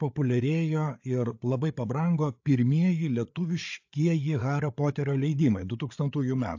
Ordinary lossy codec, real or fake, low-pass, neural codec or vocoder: AAC, 48 kbps; fake; 7.2 kHz; codec, 16 kHz, 8 kbps, FreqCodec, larger model